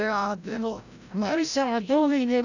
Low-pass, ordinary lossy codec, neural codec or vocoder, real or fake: 7.2 kHz; none; codec, 16 kHz, 0.5 kbps, FreqCodec, larger model; fake